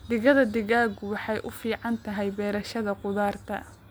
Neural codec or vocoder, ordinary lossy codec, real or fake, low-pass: none; none; real; none